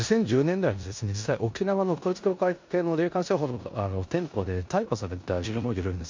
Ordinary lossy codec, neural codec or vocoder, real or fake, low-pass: MP3, 48 kbps; codec, 16 kHz in and 24 kHz out, 0.9 kbps, LongCat-Audio-Codec, four codebook decoder; fake; 7.2 kHz